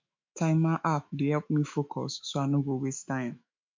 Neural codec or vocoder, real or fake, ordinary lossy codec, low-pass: autoencoder, 48 kHz, 128 numbers a frame, DAC-VAE, trained on Japanese speech; fake; MP3, 64 kbps; 7.2 kHz